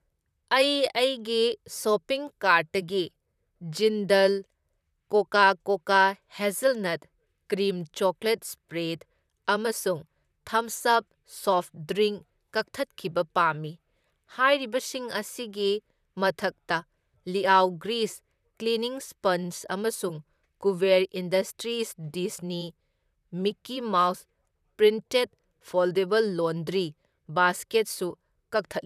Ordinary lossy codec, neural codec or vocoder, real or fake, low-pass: none; vocoder, 44.1 kHz, 128 mel bands, Pupu-Vocoder; fake; 14.4 kHz